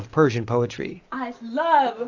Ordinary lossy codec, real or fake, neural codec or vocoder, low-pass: AAC, 48 kbps; real; none; 7.2 kHz